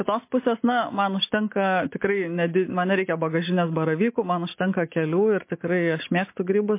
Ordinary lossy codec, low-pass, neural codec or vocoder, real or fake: MP3, 24 kbps; 3.6 kHz; none; real